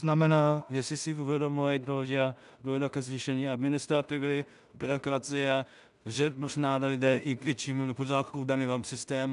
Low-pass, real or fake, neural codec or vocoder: 10.8 kHz; fake; codec, 16 kHz in and 24 kHz out, 0.4 kbps, LongCat-Audio-Codec, two codebook decoder